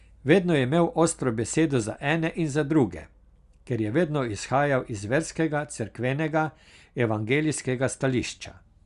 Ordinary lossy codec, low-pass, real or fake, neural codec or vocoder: Opus, 64 kbps; 10.8 kHz; real; none